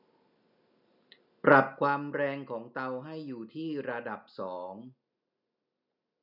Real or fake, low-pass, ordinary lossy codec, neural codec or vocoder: real; 5.4 kHz; none; none